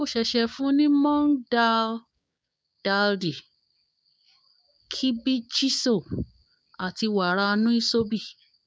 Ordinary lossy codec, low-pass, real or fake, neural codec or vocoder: none; none; fake; codec, 16 kHz, 6 kbps, DAC